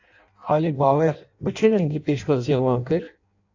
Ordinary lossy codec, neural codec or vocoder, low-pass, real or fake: AAC, 48 kbps; codec, 16 kHz in and 24 kHz out, 0.6 kbps, FireRedTTS-2 codec; 7.2 kHz; fake